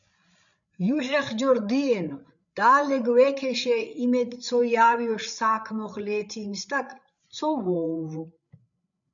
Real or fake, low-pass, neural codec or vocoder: fake; 7.2 kHz; codec, 16 kHz, 8 kbps, FreqCodec, larger model